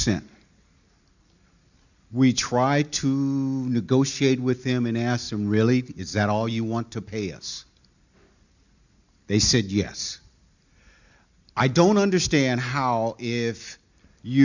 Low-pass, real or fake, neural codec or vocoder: 7.2 kHz; real; none